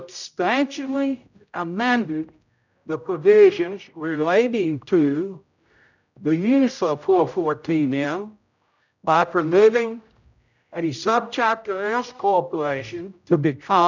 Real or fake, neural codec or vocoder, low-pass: fake; codec, 16 kHz, 0.5 kbps, X-Codec, HuBERT features, trained on general audio; 7.2 kHz